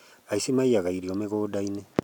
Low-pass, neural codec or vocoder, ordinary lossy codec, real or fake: 19.8 kHz; none; none; real